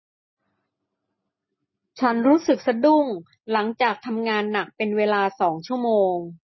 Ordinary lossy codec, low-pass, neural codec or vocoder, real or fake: MP3, 24 kbps; 7.2 kHz; none; real